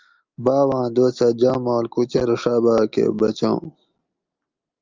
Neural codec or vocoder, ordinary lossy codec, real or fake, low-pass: none; Opus, 24 kbps; real; 7.2 kHz